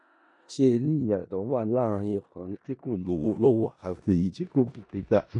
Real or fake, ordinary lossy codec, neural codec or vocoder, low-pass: fake; none; codec, 16 kHz in and 24 kHz out, 0.4 kbps, LongCat-Audio-Codec, four codebook decoder; 10.8 kHz